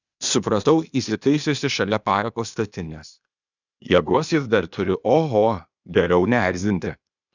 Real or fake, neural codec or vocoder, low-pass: fake; codec, 16 kHz, 0.8 kbps, ZipCodec; 7.2 kHz